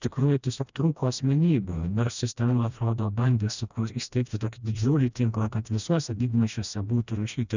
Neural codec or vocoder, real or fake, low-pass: codec, 16 kHz, 1 kbps, FreqCodec, smaller model; fake; 7.2 kHz